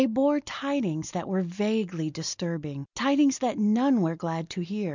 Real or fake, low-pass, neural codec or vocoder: real; 7.2 kHz; none